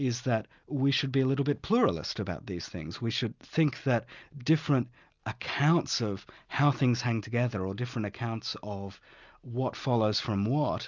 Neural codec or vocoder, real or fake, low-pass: none; real; 7.2 kHz